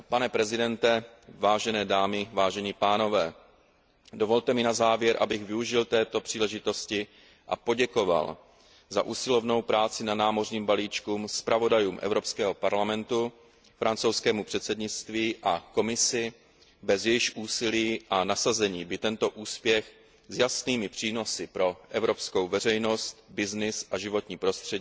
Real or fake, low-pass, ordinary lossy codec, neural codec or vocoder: real; none; none; none